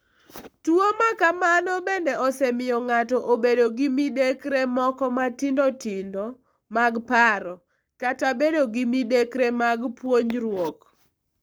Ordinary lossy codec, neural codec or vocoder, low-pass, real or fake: none; codec, 44.1 kHz, 7.8 kbps, Pupu-Codec; none; fake